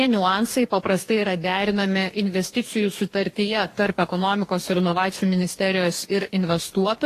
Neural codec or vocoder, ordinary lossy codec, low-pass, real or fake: codec, 44.1 kHz, 2.6 kbps, DAC; AAC, 48 kbps; 14.4 kHz; fake